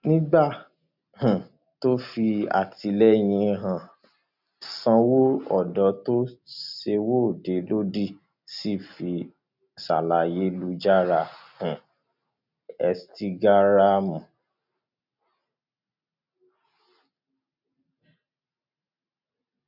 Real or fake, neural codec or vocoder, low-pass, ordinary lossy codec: real; none; 5.4 kHz; none